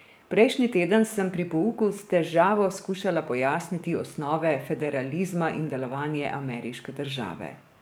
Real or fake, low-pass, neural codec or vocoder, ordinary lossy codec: fake; none; codec, 44.1 kHz, 7.8 kbps, DAC; none